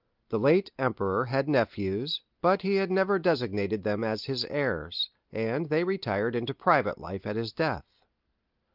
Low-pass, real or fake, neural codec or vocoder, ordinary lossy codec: 5.4 kHz; real; none; Opus, 24 kbps